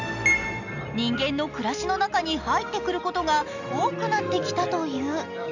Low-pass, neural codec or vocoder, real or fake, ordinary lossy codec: 7.2 kHz; none; real; none